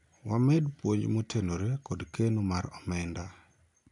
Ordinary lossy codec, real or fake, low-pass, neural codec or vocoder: none; real; 10.8 kHz; none